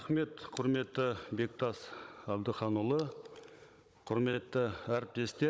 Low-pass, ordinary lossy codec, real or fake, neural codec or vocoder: none; none; fake; codec, 16 kHz, 16 kbps, FunCodec, trained on Chinese and English, 50 frames a second